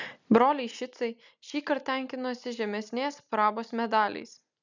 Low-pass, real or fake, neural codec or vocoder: 7.2 kHz; real; none